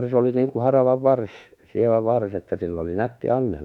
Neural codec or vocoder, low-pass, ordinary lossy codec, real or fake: autoencoder, 48 kHz, 32 numbers a frame, DAC-VAE, trained on Japanese speech; 19.8 kHz; none; fake